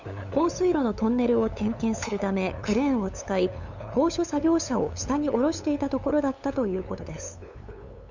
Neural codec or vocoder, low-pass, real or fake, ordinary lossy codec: codec, 16 kHz, 8 kbps, FunCodec, trained on LibriTTS, 25 frames a second; 7.2 kHz; fake; none